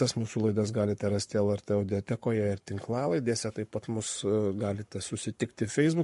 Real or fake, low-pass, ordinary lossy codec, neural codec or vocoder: fake; 14.4 kHz; MP3, 48 kbps; codec, 44.1 kHz, 7.8 kbps, Pupu-Codec